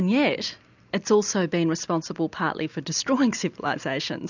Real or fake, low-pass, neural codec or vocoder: real; 7.2 kHz; none